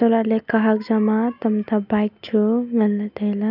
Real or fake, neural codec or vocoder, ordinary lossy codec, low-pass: real; none; none; 5.4 kHz